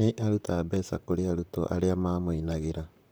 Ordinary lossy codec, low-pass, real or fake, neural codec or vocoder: none; none; fake; vocoder, 44.1 kHz, 128 mel bands, Pupu-Vocoder